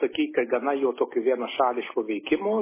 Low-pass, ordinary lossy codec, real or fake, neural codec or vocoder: 3.6 kHz; MP3, 16 kbps; real; none